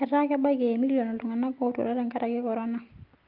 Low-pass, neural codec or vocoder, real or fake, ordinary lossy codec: 5.4 kHz; none; real; Opus, 32 kbps